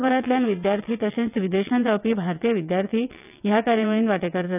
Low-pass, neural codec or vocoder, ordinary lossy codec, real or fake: 3.6 kHz; vocoder, 22.05 kHz, 80 mel bands, WaveNeXt; none; fake